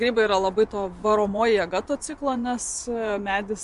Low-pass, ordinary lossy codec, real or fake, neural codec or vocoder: 10.8 kHz; MP3, 64 kbps; fake; vocoder, 24 kHz, 100 mel bands, Vocos